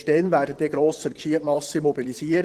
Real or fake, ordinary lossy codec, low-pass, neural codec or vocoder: fake; Opus, 16 kbps; 14.4 kHz; vocoder, 44.1 kHz, 128 mel bands, Pupu-Vocoder